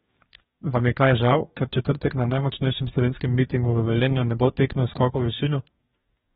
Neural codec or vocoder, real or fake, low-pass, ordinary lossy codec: codec, 44.1 kHz, 2.6 kbps, DAC; fake; 19.8 kHz; AAC, 16 kbps